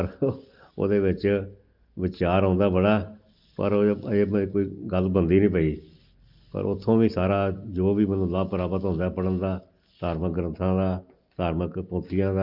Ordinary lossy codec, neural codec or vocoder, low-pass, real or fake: Opus, 32 kbps; none; 5.4 kHz; real